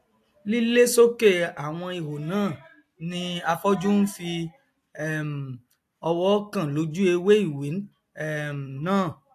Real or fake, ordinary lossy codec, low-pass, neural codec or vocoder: real; AAC, 64 kbps; 14.4 kHz; none